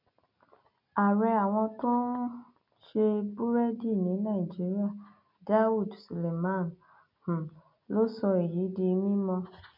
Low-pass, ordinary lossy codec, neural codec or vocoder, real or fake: 5.4 kHz; none; none; real